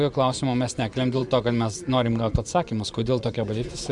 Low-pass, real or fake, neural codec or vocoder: 10.8 kHz; fake; vocoder, 24 kHz, 100 mel bands, Vocos